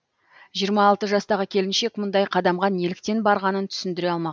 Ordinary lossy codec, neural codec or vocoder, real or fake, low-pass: none; none; real; none